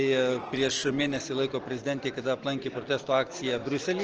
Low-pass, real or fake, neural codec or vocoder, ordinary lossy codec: 7.2 kHz; real; none; Opus, 16 kbps